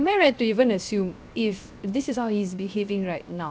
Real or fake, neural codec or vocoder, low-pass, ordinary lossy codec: fake; codec, 16 kHz, 0.7 kbps, FocalCodec; none; none